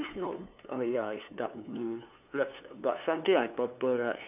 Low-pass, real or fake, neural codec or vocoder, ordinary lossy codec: 3.6 kHz; fake; codec, 16 kHz, 2 kbps, FunCodec, trained on LibriTTS, 25 frames a second; none